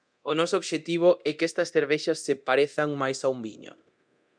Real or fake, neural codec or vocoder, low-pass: fake; codec, 24 kHz, 0.9 kbps, DualCodec; 9.9 kHz